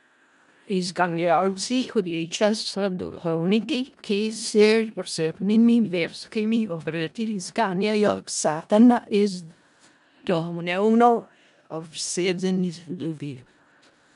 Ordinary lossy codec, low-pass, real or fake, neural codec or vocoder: MP3, 96 kbps; 10.8 kHz; fake; codec, 16 kHz in and 24 kHz out, 0.4 kbps, LongCat-Audio-Codec, four codebook decoder